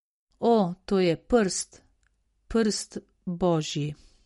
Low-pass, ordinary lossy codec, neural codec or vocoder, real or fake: 19.8 kHz; MP3, 48 kbps; none; real